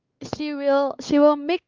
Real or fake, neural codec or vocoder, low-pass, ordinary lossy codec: fake; codec, 16 kHz, 4 kbps, X-Codec, WavLM features, trained on Multilingual LibriSpeech; 7.2 kHz; Opus, 24 kbps